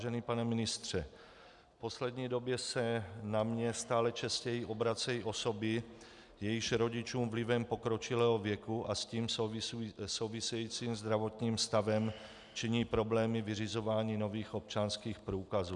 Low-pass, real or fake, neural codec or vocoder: 10.8 kHz; real; none